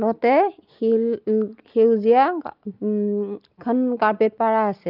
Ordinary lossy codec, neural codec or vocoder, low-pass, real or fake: Opus, 24 kbps; none; 5.4 kHz; real